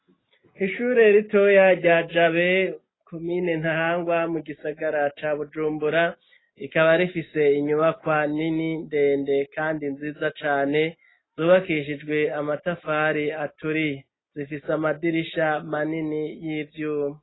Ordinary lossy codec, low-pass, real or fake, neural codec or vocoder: AAC, 16 kbps; 7.2 kHz; real; none